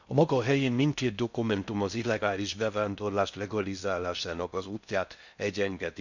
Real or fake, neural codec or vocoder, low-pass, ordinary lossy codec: fake; codec, 16 kHz in and 24 kHz out, 0.6 kbps, FocalCodec, streaming, 2048 codes; 7.2 kHz; none